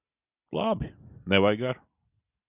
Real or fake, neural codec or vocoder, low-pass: real; none; 3.6 kHz